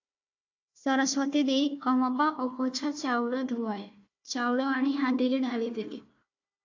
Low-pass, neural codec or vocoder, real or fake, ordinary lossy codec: 7.2 kHz; codec, 16 kHz, 1 kbps, FunCodec, trained on Chinese and English, 50 frames a second; fake; AAC, 48 kbps